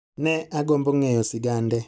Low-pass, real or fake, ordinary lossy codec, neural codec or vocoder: none; real; none; none